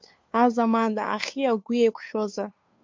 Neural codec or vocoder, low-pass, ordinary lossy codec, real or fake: codec, 16 kHz, 2 kbps, FunCodec, trained on Chinese and English, 25 frames a second; 7.2 kHz; MP3, 48 kbps; fake